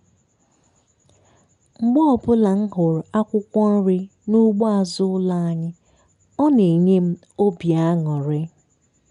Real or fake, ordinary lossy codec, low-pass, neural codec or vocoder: real; none; 10.8 kHz; none